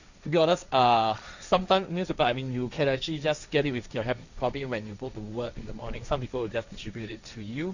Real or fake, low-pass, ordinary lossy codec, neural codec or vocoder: fake; 7.2 kHz; none; codec, 16 kHz, 1.1 kbps, Voila-Tokenizer